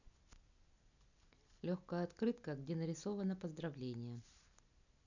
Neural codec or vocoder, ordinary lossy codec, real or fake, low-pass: none; none; real; 7.2 kHz